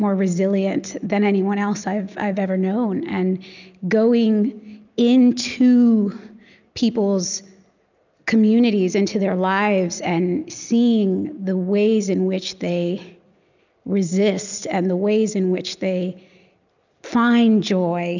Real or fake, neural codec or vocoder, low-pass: real; none; 7.2 kHz